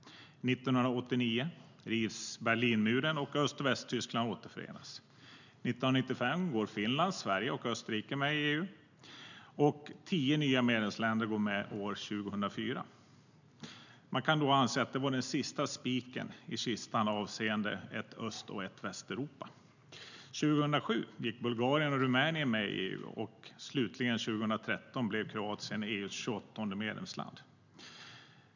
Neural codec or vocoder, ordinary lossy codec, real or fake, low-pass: none; none; real; 7.2 kHz